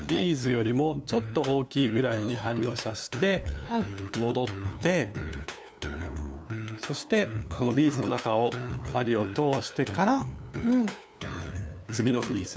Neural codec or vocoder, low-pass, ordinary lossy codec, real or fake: codec, 16 kHz, 2 kbps, FunCodec, trained on LibriTTS, 25 frames a second; none; none; fake